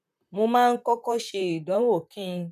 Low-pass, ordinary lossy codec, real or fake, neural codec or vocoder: 14.4 kHz; none; fake; vocoder, 44.1 kHz, 128 mel bands, Pupu-Vocoder